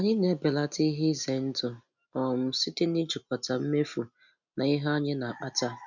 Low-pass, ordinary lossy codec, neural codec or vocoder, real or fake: 7.2 kHz; none; none; real